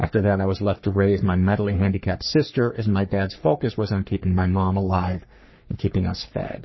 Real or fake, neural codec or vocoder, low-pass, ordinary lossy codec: fake; codec, 44.1 kHz, 3.4 kbps, Pupu-Codec; 7.2 kHz; MP3, 24 kbps